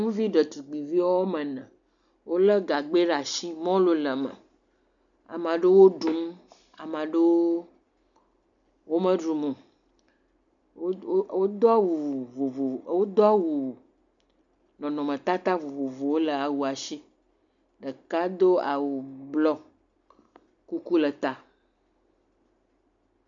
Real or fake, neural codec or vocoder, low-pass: real; none; 7.2 kHz